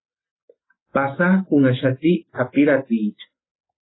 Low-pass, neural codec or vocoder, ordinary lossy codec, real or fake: 7.2 kHz; none; AAC, 16 kbps; real